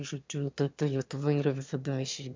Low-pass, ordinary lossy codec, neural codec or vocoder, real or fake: 7.2 kHz; MP3, 64 kbps; autoencoder, 22.05 kHz, a latent of 192 numbers a frame, VITS, trained on one speaker; fake